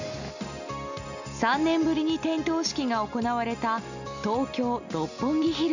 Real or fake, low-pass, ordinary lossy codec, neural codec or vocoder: real; 7.2 kHz; none; none